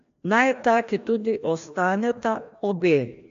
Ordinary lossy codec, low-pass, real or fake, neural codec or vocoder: AAC, 64 kbps; 7.2 kHz; fake; codec, 16 kHz, 1 kbps, FreqCodec, larger model